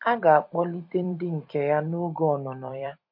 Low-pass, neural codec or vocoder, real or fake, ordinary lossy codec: 5.4 kHz; vocoder, 44.1 kHz, 128 mel bands every 256 samples, BigVGAN v2; fake; MP3, 32 kbps